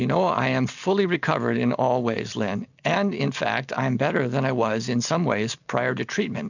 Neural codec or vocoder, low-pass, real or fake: none; 7.2 kHz; real